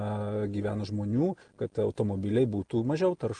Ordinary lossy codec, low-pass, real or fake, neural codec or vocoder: Opus, 24 kbps; 9.9 kHz; real; none